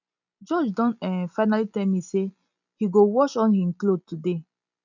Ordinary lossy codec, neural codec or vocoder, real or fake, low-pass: none; none; real; 7.2 kHz